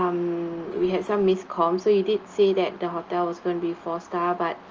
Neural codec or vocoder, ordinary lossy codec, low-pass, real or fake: none; Opus, 24 kbps; 7.2 kHz; real